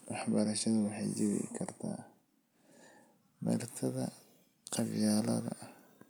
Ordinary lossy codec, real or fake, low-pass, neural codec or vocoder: none; real; none; none